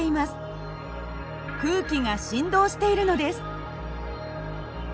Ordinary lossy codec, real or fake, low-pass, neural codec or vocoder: none; real; none; none